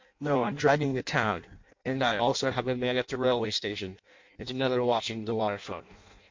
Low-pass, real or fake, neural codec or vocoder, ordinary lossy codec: 7.2 kHz; fake; codec, 16 kHz in and 24 kHz out, 0.6 kbps, FireRedTTS-2 codec; MP3, 48 kbps